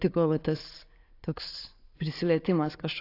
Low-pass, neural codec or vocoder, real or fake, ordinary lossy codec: 5.4 kHz; none; real; AAC, 32 kbps